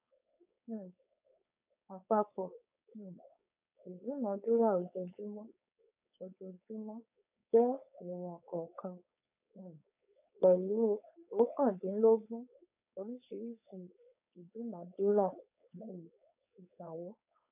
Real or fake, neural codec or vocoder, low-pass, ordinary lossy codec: fake; codec, 16 kHz, 4.8 kbps, FACodec; 3.6 kHz; none